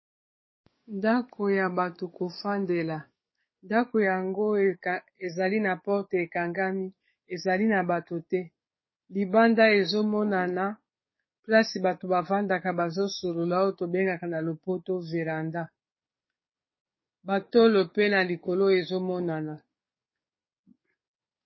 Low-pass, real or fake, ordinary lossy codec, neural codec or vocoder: 7.2 kHz; fake; MP3, 24 kbps; codec, 44.1 kHz, 7.8 kbps, DAC